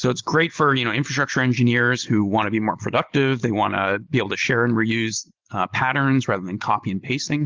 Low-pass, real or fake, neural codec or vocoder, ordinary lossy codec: 7.2 kHz; real; none; Opus, 16 kbps